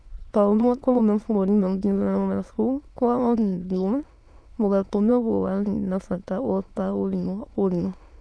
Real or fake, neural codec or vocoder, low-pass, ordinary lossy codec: fake; autoencoder, 22.05 kHz, a latent of 192 numbers a frame, VITS, trained on many speakers; none; none